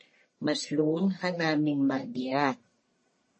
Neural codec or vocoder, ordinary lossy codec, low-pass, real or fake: codec, 44.1 kHz, 1.7 kbps, Pupu-Codec; MP3, 32 kbps; 10.8 kHz; fake